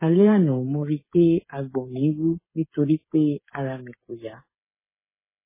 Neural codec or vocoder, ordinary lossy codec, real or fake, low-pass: codec, 16 kHz, 8 kbps, FreqCodec, smaller model; MP3, 16 kbps; fake; 3.6 kHz